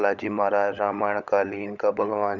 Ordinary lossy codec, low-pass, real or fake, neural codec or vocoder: none; 7.2 kHz; fake; codec, 16 kHz, 16 kbps, FunCodec, trained on LibriTTS, 50 frames a second